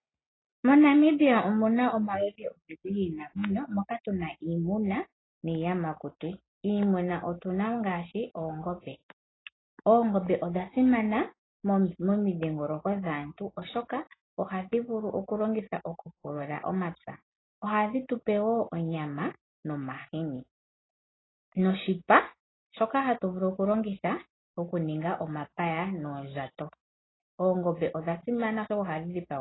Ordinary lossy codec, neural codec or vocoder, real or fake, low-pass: AAC, 16 kbps; none; real; 7.2 kHz